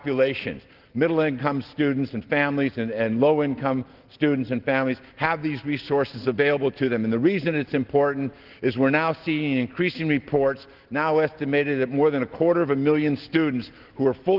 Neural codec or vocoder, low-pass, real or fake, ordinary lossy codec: none; 5.4 kHz; real; Opus, 16 kbps